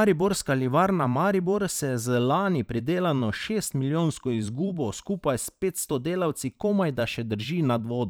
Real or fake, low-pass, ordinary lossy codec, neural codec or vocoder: fake; none; none; vocoder, 44.1 kHz, 128 mel bands every 512 samples, BigVGAN v2